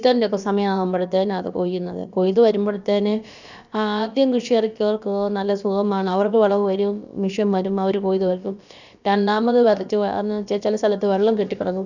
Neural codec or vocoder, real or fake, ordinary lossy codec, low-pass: codec, 16 kHz, about 1 kbps, DyCAST, with the encoder's durations; fake; none; 7.2 kHz